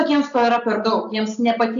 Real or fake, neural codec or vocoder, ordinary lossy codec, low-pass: real; none; MP3, 64 kbps; 7.2 kHz